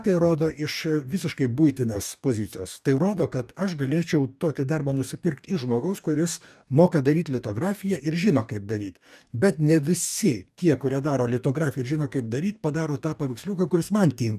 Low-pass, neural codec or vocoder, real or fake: 14.4 kHz; codec, 44.1 kHz, 2.6 kbps, DAC; fake